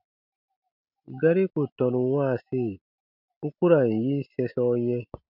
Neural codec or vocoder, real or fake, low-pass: none; real; 5.4 kHz